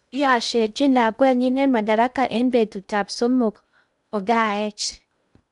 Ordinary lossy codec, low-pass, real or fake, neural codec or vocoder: none; 10.8 kHz; fake; codec, 16 kHz in and 24 kHz out, 0.6 kbps, FocalCodec, streaming, 2048 codes